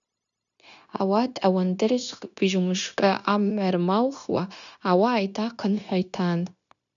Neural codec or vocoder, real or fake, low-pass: codec, 16 kHz, 0.9 kbps, LongCat-Audio-Codec; fake; 7.2 kHz